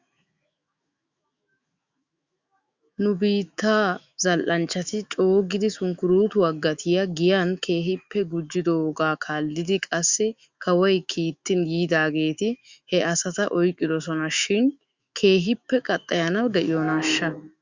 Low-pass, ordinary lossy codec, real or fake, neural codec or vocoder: 7.2 kHz; Opus, 64 kbps; fake; autoencoder, 48 kHz, 128 numbers a frame, DAC-VAE, trained on Japanese speech